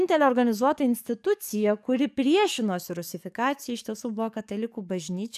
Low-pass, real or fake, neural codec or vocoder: 14.4 kHz; fake; codec, 44.1 kHz, 7.8 kbps, DAC